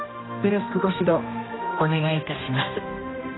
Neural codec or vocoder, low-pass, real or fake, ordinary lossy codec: codec, 16 kHz, 1 kbps, X-Codec, HuBERT features, trained on general audio; 7.2 kHz; fake; AAC, 16 kbps